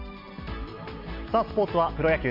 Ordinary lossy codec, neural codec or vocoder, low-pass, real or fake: MP3, 48 kbps; none; 5.4 kHz; real